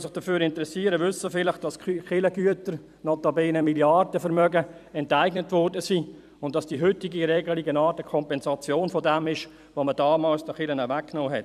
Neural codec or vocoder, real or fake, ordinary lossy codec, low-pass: vocoder, 44.1 kHz, 128 mel bands every 256 samples, BigVGAN v2; fake; none; 14.4 kHz